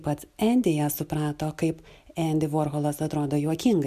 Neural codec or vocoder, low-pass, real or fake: none; 14.4 kHz; real